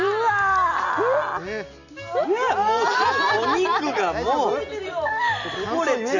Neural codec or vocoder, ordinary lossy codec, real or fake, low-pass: none; none; real; 7.2 kHz